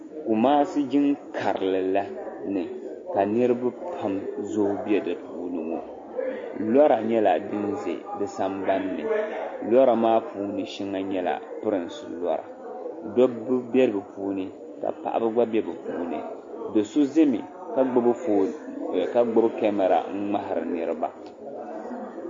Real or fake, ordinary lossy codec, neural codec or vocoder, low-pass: real; MP3, 32 kbps; none; 7.2 kHz